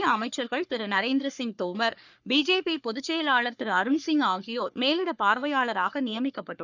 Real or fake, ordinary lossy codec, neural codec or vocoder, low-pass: fake; none; codec, 44.1 kHz, 3.4 kbps, Pupu-Codec; 7.2 kHz